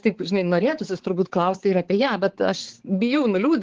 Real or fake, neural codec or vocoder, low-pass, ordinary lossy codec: fake; codec, 16 kHz, 4 kbps, X-Codec, HuBERT features, trained on balanced general audio; 7.2 kHz; Opus, 16 kbps